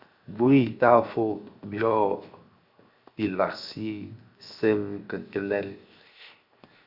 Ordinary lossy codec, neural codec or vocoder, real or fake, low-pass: Opus, 64 kbps; codec, 16 kHz, 0.7 kbps, FocalCodec; fake; 5.4 kHz